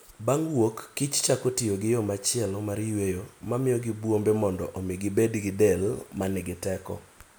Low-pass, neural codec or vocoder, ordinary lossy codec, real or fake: none; none; none; real